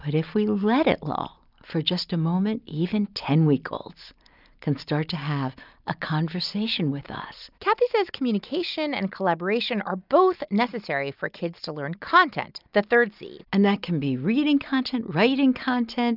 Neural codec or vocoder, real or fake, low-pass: none; real; 5.4 kHz